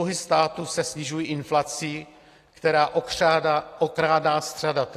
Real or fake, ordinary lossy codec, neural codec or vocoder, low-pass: real; AAC, 48 kbps; none; 14.4 kHz